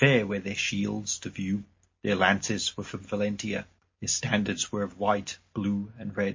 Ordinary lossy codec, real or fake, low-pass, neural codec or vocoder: MP3, 32 kbps; real; 7.2 kHz; none